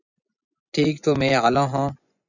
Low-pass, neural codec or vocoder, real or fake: 7.2 kHz; vocoder, 24 kHz, 100 mel bands, Vocos; fake